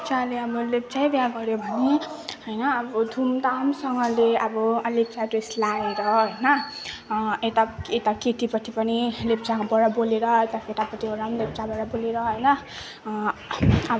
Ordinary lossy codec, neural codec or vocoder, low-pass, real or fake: none; none; none; real